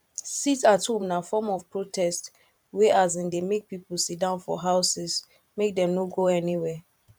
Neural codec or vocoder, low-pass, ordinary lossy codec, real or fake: vocoder, 44.1 kHz, 128 mel bands every 512 samples, BigVGAN v2; 19.8 kHz; none; fake